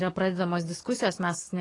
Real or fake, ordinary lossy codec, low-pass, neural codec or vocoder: fake; AAC, 32 kbps; 10.8 kHz; codec, 44.1 kHz, 7.8 kbps, DAC